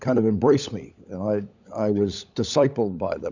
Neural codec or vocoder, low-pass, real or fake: codec, 16 kHz, 16 kbps, FunCodec, trained on LibriTTS, 50 frames a second; 7.2 kHz; fake